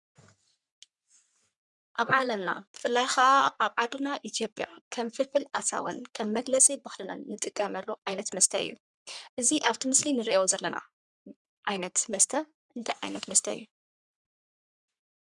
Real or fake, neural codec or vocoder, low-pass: fake; codec, 44.1 kHz, 3.4 kbps, Pupu-Codec; 10.8 kHz